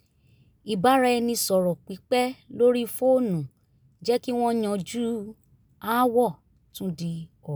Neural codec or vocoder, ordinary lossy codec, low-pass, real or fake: none; none; none; real